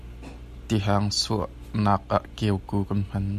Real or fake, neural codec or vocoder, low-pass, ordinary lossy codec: real; none; 14.4 kHz; MP3, 96 kbps